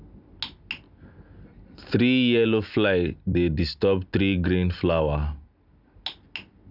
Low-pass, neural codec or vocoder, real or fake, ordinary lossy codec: 5.4 kHz; none; real; none